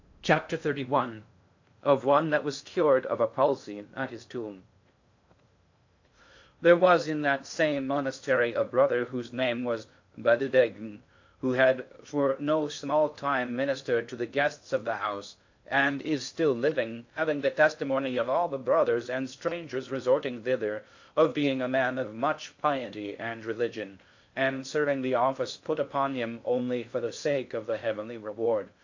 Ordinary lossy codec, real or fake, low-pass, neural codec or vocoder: AAC, 48 kbps; fake; 7.2 kHz; codec, 16 kHz in and 24 kHz out, 0.6 kbps, FocalCodec, streaming, 2048 codes